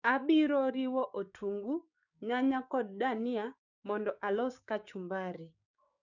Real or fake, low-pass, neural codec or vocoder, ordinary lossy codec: fake; 7.2 kHz; codec, 16 kHz, 6 kbps, DAC; none